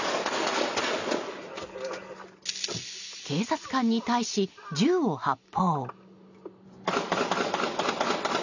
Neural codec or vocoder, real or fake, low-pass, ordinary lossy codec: none; real; 7.2 kHz; none